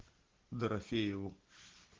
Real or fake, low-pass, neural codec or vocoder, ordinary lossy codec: real; 7.2 kHz; none; Opus, 16 kbps